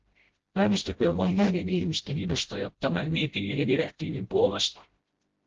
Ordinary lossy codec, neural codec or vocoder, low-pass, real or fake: Opus, 16 kbps; codec, 16 kHz, 0.5 kbps, FreqCodec, smaller model; 7.2 kHz; fake